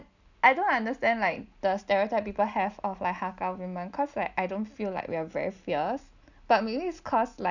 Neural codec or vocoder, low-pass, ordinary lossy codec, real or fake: none; 7.2 kHz; none; real